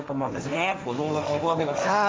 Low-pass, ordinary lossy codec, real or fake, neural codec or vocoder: none; none; fake; codec, 16 kHz, 1.1 kbps, Voila-Tokenizer